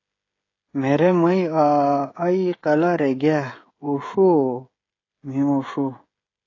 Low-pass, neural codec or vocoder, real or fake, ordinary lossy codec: 7.2 kHz; codec, 16 kHz, 16 kbps, FreqCodec, smaller model; fake; AAC, 32 kbps